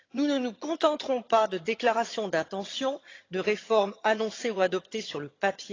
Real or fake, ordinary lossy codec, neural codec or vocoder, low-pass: fake; AAC, 32 kbps; vocoder, 22.05 kHz, 80 mel bands, HiFi-GAN; 7.2 kHz